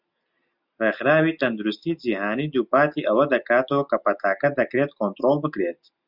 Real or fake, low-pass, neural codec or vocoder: real; 5.4 kHz; none